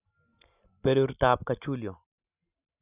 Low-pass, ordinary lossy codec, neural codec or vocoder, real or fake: 3.6 kHz; none; none; real